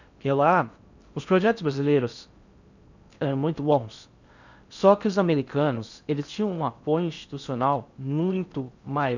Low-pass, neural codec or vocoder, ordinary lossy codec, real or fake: 7.2 kHz; codec, 16 kHz in and 24 kHz out, 0.6 kbps, FocalCodec, streaming, 2048 codes; none; fake